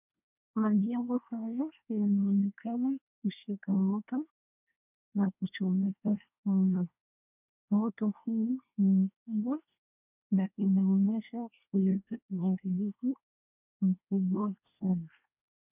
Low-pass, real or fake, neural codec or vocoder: 3.6 kHz; fake; codec, 24 kHz, 1 kbps, SNAC